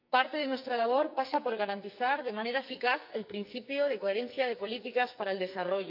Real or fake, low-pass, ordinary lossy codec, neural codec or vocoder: fake; 5.4 kHz; none; codec, 44.1 kHz, 2.6 kbps, SNAC